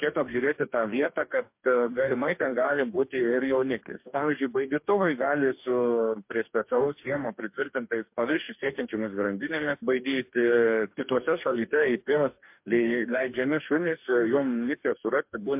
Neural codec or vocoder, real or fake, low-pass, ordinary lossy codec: codec, 44.1 kHz, 2.6 kbps, DAC; fake; 3.6 kHz; MP3, 32 kbps